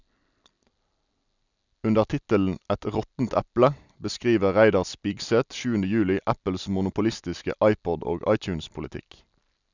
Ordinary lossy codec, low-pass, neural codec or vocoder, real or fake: none; 7.2 kHz; none; real